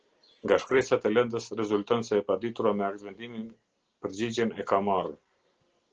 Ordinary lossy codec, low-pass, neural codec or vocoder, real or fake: Opus, 16 kbps; 7.2 kHz; none; real